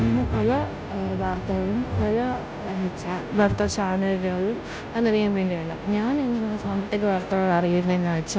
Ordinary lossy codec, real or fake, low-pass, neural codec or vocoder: none; fake; none; codec, 16 kHz, 0.5 kbps, FunCodec, trained on Chinese and English, 25 frames a second